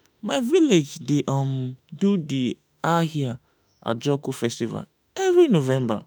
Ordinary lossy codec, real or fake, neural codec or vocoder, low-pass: none; fake; autoencoder, 48 kHz, 32 numbers a frame, DAC-VAE, trained on Japanese speech; none